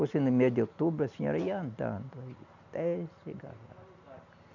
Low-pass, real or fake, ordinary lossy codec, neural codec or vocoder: 7.2 kHz; real; none; none